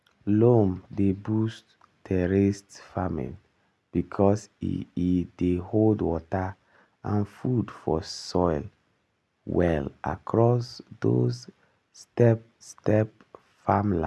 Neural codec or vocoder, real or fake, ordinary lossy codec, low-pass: none; real; none; none